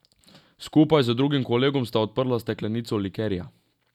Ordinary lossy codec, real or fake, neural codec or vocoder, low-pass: none; real; none; 19.8 kHz